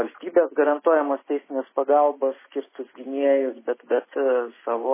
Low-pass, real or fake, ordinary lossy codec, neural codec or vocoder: 3.6 kHz; real; MP3, 16 kbps; none